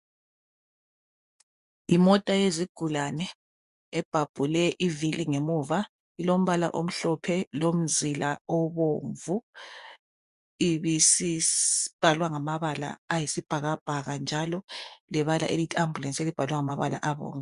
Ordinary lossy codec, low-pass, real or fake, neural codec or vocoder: MP3, 96 kbps; 10.8 kHz; fake; vocoder, 24 kHz, 100 mel bands, Vocos